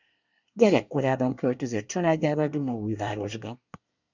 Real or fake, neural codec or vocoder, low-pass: fake; codec, 24 kHz, 1 kbps, SNAC; 7.2 kHz